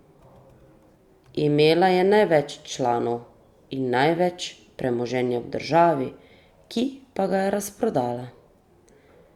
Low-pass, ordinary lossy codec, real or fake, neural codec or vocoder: 19.8 kHz; Opus, 64 kbps; real; none